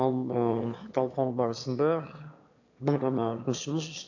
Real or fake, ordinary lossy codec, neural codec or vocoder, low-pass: fake; AAC, 48 kbps; autoencoder, 22.05 kHz, a latent of 192 numbers a frame, VITS, trained on one speaker; 7.2 kHz